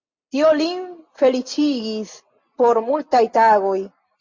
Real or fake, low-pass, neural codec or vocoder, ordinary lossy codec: real; 7.2 kHz; none; MP3, 48 kbps